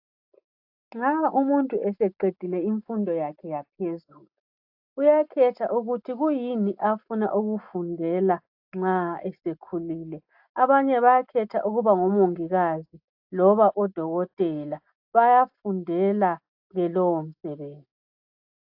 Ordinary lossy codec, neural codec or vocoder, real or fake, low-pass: AAC, 48 kbps; none; real; 5.4 kHz